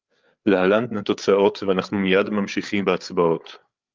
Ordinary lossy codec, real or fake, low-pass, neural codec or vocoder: Opus, 24 kbps; fake; 7.2 kHz; codec, 16 kHz, 8 kbps, FreqCodec, larger model